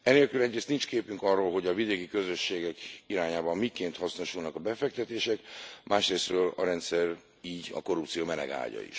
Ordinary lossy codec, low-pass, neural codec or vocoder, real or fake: none; none; none; real